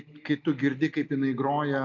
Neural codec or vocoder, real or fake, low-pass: none; real; 7.2 kHz